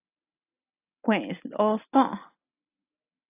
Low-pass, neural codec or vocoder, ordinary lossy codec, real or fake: 3.6 kHz; none; AAC, 24 kbps; real